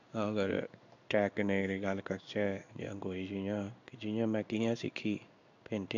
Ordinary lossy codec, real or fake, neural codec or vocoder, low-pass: none; fake; codec, 16 kHz in and 24 kHz out, 1 kbps, XY-Tokenizer; 7.2 kHz